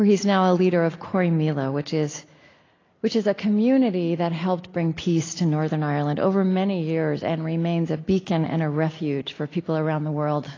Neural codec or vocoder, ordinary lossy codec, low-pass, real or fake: none; AAC, 32 kbps; 7.2 kHz; real